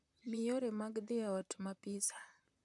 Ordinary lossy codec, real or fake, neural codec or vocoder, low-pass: none; fake; vocoder, 44.1 kHz, 128 mel bands, Pupu-Vocoder; 10.8 kHz